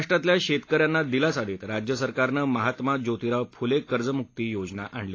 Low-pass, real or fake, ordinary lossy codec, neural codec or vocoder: 7.2 kHz; real; AAC, 32 kbps; none